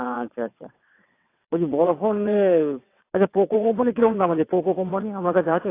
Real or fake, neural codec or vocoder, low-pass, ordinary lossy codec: fake; vocoder, 22.05 kHz, 80 mel bands, WaveNeXt; 3.6 kHz; AAC, 24 kbps